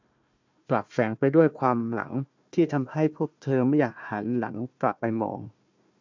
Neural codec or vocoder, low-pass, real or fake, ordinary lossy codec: codec, 16 kHz, 1 kbps, FunCodec, trained on Chinese and English, 50 frames a second; 7.2 kHz; fake; MP3, 64 kbps